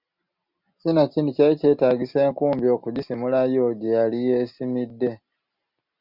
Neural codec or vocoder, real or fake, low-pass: none; real; 5.4 kHz